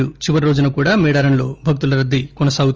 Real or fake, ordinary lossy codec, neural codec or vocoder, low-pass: real; Opus, 24 kbps; none; 7.2 kHz